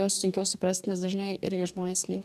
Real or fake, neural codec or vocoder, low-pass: fake; codec, 44.1 kHz, 2.6 kbps, DAC; 14.4 kHz